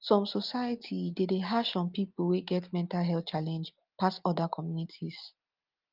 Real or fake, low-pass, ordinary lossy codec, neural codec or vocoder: real; 5.4 kHz; Opus, 24 kbps; none